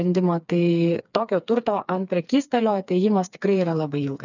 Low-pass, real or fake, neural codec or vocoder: 7.2 kHz; fake; codec, 16 kHz, 4 kbps, FreqCodec, smaller model